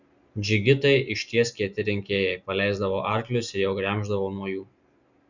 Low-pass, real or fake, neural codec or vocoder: 7.2 kHz; real; none